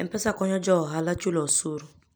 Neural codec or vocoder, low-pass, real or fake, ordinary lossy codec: none; none; real; none